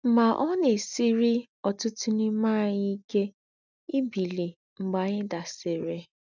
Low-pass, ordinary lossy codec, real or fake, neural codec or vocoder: 7.2 kHz; none; real; none